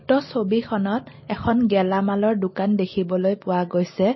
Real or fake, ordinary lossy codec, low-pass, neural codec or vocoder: real; MP3, 24 kbps; 7.2 kHz; none